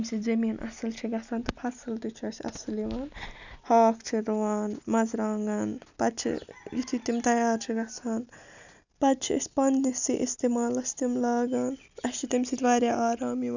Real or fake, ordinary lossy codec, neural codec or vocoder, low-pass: real; none; none; 7.2 kHz